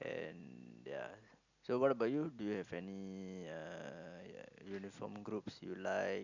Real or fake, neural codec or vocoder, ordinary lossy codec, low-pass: real; none; none; 7.2 kHz